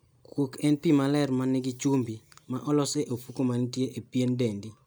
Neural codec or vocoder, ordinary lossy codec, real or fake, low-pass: none; none; real; none